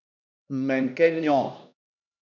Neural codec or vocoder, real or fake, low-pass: codec, 16 kHz, 1 kbps, X-Codec, HuBERT features, trained on LibriSpeech; fake; 7.2 kHz